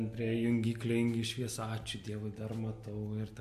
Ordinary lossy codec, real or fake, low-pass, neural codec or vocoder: MP3, 64 kbps; real; 14.4 kHz; none